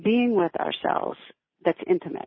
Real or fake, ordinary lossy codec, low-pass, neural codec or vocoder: real; MP3, 24 kbps; 7.2 kHz; none